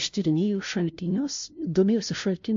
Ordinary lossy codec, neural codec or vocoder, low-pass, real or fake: MP3, 48 kbps; codec, 16 kHz, 0.5 kbps, FunCodec, trained on LibriTTS, 25 frames a second; 7.2 kHz; fake